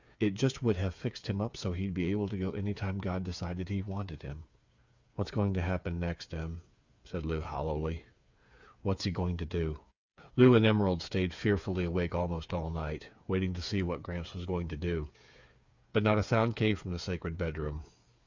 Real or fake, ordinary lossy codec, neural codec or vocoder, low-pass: fake; Opus, 64 kbps; codec, 16 kHz, 8 kbps, FreqCodec, smaller model; 7.2 kHz